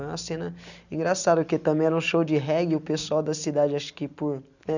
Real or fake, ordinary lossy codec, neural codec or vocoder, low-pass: real; none; none; 7.2 kHz